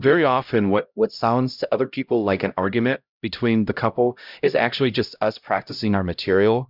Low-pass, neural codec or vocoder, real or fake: 5.4 kHz; codec, 16 kHz, 0.5 kbps, X-Codec, HuBERT features, trained on LibriSpeech; fake